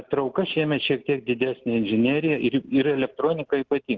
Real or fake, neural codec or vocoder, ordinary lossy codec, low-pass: real; none; Opus, 16 kbps; 7.2 kHz